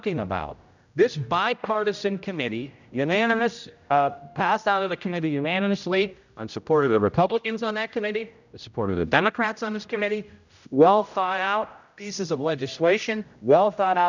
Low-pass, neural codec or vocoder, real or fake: 7.2 kHz; codec, 16 kHz, 0.5 kbps, X-Codec, HuBERT features, trained on general audio; fake